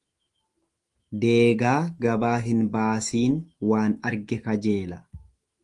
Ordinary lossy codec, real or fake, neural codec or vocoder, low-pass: Opus, 24 kbps; fake; autoencoder, 48 kHz, 128 numbers a frame, DAC-VAE, trained on Japanese speech; 10.8 kHz